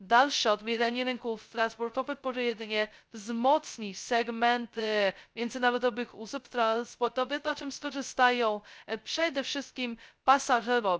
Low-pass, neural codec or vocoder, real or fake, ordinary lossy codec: none; codec, 16 kHz, 0.2 kbps, FocalCodec; fake; none